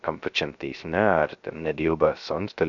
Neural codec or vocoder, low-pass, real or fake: codec, 16 kHz, 0.3 kbps, FocalCodec; 7.2 kHz; fake